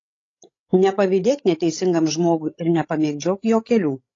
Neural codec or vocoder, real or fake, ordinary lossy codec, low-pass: codec, 16 kHz, 16 kbps, FreqCodec, larger model; fake; AAC, 32 kbps; 7.2 kHz